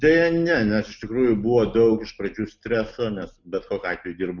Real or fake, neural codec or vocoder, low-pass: real; none; 7.2 kHz